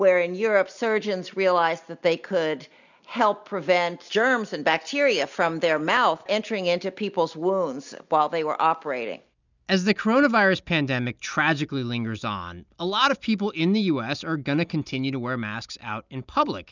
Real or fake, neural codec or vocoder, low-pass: real; none; 7.2 kHz